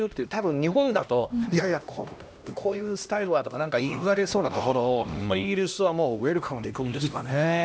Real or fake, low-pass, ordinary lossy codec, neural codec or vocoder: fake; none; none; codec, 16 kHz, 1 kbps, X-Codec, HuBERT features, trained on LibriSpeech